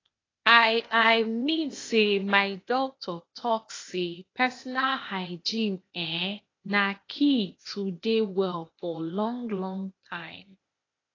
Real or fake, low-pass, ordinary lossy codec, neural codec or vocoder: fake; 7.2 kHz; AAC, 32 kbps; codec, 16 kHz, 0.8 kbps, ZipCodec